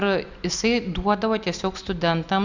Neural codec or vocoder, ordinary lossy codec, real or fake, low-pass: none; Opus, 64 kbps; real; 7.2 kHz